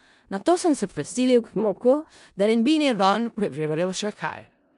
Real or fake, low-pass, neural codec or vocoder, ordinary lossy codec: fake; 10.8 kHz; codec, 16 kHz in and 24 kHz out, 0.4 kbps, LongCat-Audio-Codec, four codebook decoder; none